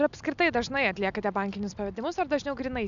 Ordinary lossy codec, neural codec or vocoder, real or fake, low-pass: MP3, 96 kbps; none; real; 7.2 kHz